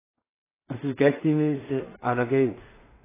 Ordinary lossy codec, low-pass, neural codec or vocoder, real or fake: AAC, 16 kbps; 3.6 kHz; codec, 16 kHz in and 24 kHz out, 0.4 kbps, LongCat-Audio-Codec, two codebook decoder; fake